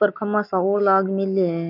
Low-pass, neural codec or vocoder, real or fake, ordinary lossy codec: 5.4 kHz; none; real; none